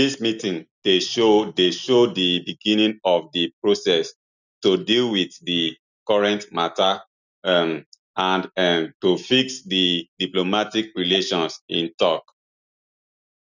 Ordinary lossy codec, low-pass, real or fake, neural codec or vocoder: none; 7.2 kHz; real; none